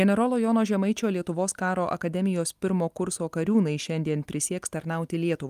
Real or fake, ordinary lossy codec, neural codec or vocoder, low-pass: real; Opus, 32 kbps; none; 14.4 kHz